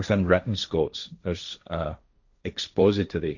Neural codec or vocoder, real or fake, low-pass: codec, 16 kHz, 1.1 kbps, Voila-Tokenizer; fake; 7.2 kHz